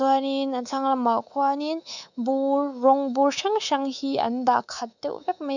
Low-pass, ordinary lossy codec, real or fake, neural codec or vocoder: 7.2 kHz; none; fake; codec, 24 kHz, 3.1 kbps, DualCodec